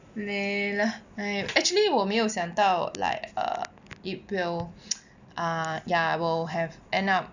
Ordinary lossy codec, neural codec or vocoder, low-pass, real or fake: none; none; 7.2 kHz; real